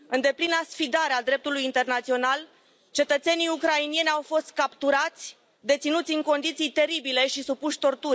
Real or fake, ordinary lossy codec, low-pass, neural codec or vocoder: real; none; none; none